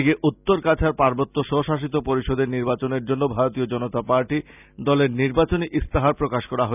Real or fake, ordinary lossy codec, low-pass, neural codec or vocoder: real; none; 3.6 kHz; none